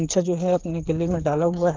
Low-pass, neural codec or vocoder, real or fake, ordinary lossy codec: 7.2 kHz; codec, 16 kHz, 8 kbps, FreqCodec, smaller model; fake; Opus, 16 kbps